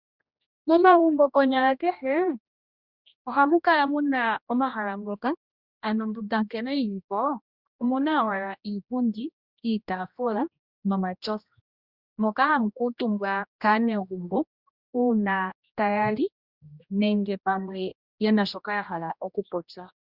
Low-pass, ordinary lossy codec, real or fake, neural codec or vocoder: 5.4 kHz; Opus, 64 kbps; fake; codec, 16 kHz, 1 kbps, X-Codec, HuBERT features, trained on general audio